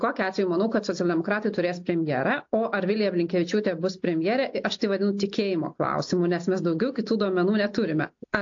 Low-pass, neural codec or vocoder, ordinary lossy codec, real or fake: 7.2 kHz; none; AAC, 48 kbps; real